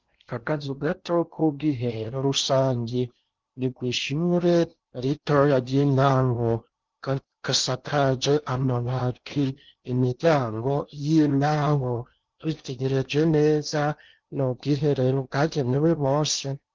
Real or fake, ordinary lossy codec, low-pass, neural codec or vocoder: fake; Opus, 32 kbps; 7.2 kHz; codec, 16 kHz in and 24 kHz out, 0.6 kbps, FocalCodec, streaming, 4096 codes